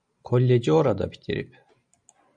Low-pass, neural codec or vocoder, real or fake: 9.9 kHz; none; real